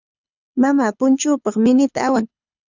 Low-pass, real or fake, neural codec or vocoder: 7.2 kHz; fake; codec, 24 kHz, 6 kbps, HILCodec